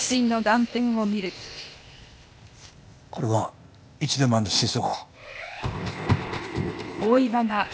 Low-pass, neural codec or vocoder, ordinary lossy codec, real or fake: none; codec, 16 kHz, 0.8 kbps, ZipCodec; none; fake